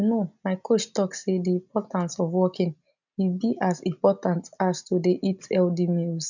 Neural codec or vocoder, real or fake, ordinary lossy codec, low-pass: none; real; none; 7.2 kHz